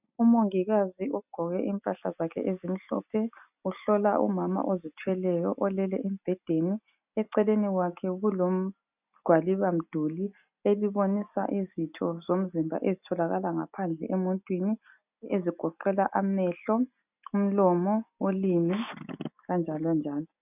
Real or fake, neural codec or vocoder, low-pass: real; none; 3.6 kHz